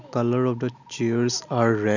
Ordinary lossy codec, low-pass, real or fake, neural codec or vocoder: none; 7.2 kHz; real; none